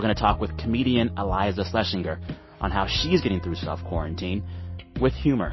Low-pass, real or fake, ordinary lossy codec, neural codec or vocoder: 7.2 kHz; real; MP3, 24 kbps; none